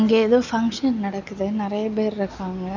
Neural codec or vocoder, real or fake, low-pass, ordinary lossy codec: none; real; 7.2 kHz; none